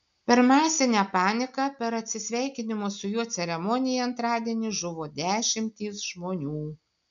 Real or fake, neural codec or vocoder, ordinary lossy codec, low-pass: real; none; MP3, 96 kbps; 7.2 kHz